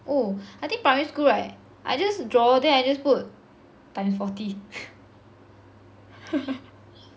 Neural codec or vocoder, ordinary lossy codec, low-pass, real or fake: none; none; none; real